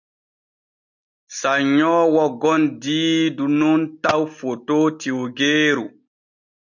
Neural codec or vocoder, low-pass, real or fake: none; 7.2 kHz; real